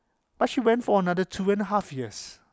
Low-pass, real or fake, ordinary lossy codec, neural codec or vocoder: none; real; none; none